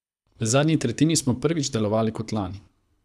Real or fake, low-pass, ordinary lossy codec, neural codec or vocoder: fake; none; none; codec, 24 kHz, 6 kbps, HILCodec